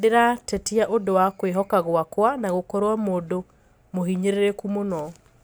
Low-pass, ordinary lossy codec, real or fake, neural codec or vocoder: none; none; real; none